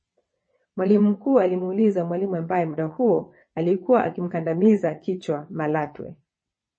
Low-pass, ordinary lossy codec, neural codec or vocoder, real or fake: 9.9 kHz; MP3, 32 kbps; vocoder, 22.05 kHz, 80 mel bands, WaveNeXt; fake